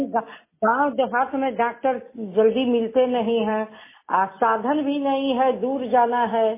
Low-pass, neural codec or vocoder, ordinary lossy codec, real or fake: 3.6 kHz; none; MP3, 16 kbps; real